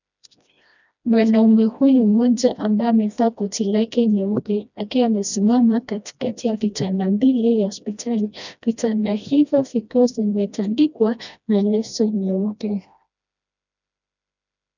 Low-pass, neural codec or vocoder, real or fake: 7.2 kHz; codec, 16 kHz, 1 kbps, FreqCodec, smaller model; fake